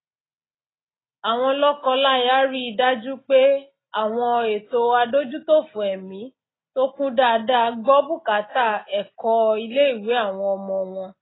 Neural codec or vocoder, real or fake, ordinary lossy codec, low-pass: none; real; AAC, 16 kbps; 7.2 kHz